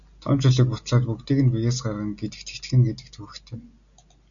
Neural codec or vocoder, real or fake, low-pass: none; real; 7.2 kHz